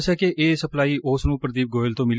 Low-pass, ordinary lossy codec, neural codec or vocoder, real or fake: none; none; none; real